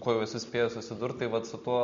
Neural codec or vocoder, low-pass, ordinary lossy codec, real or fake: none; 7.2 kHz; MP3, 48 kbps; real